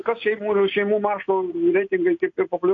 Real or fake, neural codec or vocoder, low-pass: real; none; 7.2 kHz